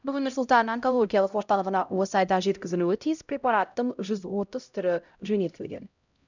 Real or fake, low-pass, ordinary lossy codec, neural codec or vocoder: fake; 7.2 kHz; none; codec, 16 kHz, 0.5 kbps, X-Codec, HuBERT features, trained on LibriSpeech